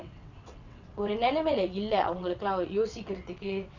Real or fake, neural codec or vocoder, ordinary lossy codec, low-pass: fake; vocoder, 22.05 kHz, 80 mel bands, WaveNeXt; none; 7.2 kHz